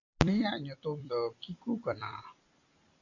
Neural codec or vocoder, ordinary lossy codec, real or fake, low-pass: vocoder, 44.1 kHz, 128 mel bands every 256 samples, BigVGAN v2; AAC, 48 kbps; fake; 7.2 kHz